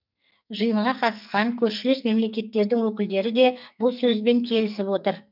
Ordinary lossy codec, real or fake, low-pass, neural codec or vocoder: none; fake; 5.4 kHz; codec, 44.1 kHz, 2.6 kbps, SNAC